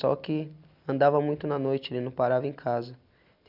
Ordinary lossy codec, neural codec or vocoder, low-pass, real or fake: none; none; 5.4 kHz; real